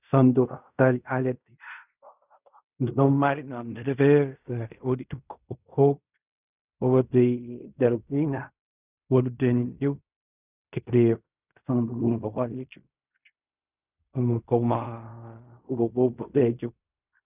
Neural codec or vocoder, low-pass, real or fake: codec, 16 kHz in and 24 kHz out, 0.4 kbps, LongCat-Audio-Codec, fine tuned four codebook decoder; 3.6 kHz; fake